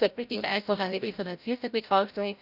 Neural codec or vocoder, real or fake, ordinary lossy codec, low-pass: codec, 16 kHz, 0.5 kbps, FreqCodec, larger model; fake; none; 5.4 kHz